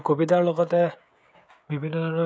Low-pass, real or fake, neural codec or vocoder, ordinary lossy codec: none; fake; codec, 16 kHz, 16 kbps, FreqCodec, smaller model; none